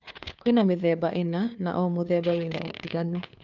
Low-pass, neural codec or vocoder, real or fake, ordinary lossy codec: 7.2 kHz; codec, 16 kHz, 2 kbps, FunCodec, trained on Chinese and English, 25 frames a second; fake; none